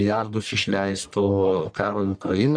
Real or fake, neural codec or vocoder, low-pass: fake; codec, 44.1 kHz, 1.7 kbps, Pupu-Codec; 9.9 kHz